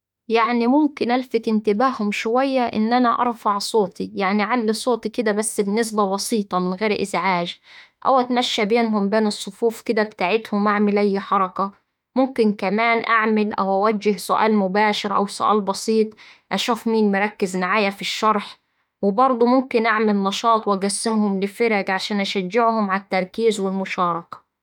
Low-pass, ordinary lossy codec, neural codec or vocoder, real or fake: 19.8 kHz; none; autoencoder, 48 kHz, 32 numbers a frame, DAC-VAE, trained on Japanese speech; fake